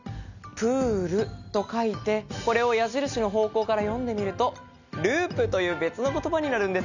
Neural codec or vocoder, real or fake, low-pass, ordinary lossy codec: none; real; 7.2 kHz; none